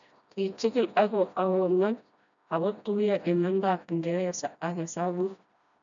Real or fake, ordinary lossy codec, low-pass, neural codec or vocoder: fake; MP3, 96 kbps; 7.2 kHz; codec, 16 kHz, 1 kbps, FreqCodec, smaller model